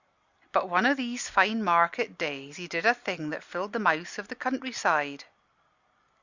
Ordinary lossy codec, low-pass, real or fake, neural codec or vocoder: Opus, 64 kbps; 7.2 kHz; real; none